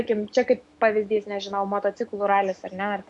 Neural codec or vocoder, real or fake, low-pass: autoencoder, 48 kHz, 128 numbers a frame, DAC-VAE, trained on Japanese speech; fake; 10.8 kHz